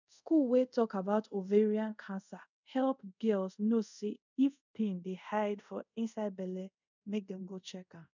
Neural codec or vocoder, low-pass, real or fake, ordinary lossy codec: codec, 24 kHz, 0.5 kbps, DualCodec; 7.2 kHz; fake; none